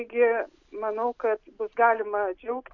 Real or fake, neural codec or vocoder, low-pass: real; none; 7.2 kHz